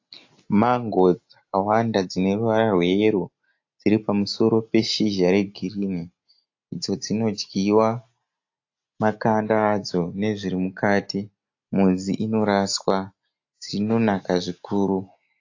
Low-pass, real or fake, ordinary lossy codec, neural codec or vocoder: 7.2 kHz; real; AAC, 48 kbps; none